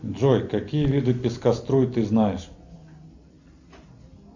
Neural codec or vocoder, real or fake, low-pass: none; real; 7.2 kHz